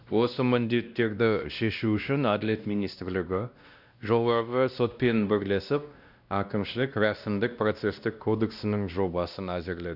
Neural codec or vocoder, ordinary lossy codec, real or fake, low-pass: codec, 16 kHz, 1 kbps, X-Codec, WavLM features, trained on Multilingual LibriSpeech; none; fake; 5.4 kHz